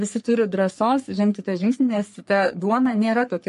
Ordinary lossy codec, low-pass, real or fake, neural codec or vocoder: MP3, 48 kbps; 14.4 kHz; fake; codec, 44.1 kHz, 3.4 kbps, Pupu-Codec